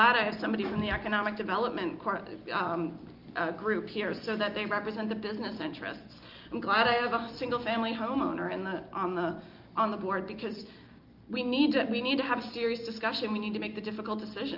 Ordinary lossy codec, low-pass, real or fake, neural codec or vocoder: Opus, 24 kbps; 5.4 kHz; real; none